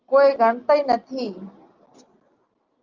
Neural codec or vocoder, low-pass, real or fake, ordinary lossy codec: none; 7.2 kHz; real; Opus, 24 kbps